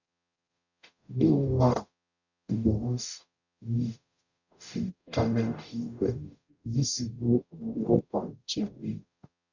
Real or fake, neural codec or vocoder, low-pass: fake; codec, 44.1 kHz, 0.9 kbps, DAC; 7.2 kHz